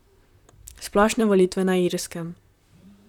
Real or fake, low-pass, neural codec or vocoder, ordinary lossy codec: fake; 19.8 kHz; vocoder, 44.1 kHz, 128 mel bands, Pupu-Vocoder; none